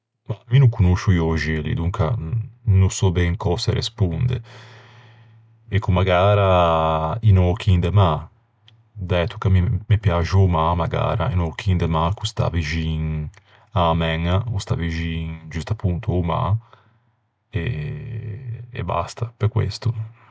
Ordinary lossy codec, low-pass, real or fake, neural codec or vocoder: none; none; real; none